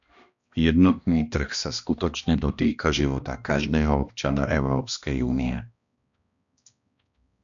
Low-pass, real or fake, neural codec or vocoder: 7.2 kHz; fake; codec, 16 kHz, 1 kbps, X-Codec, HuBERT features, trained on balanced general audio